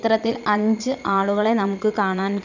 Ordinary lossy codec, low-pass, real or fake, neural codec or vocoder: none; 7.2 kHz; fake; vocoder, 22.05 kHz, 80 mel bands, Vocos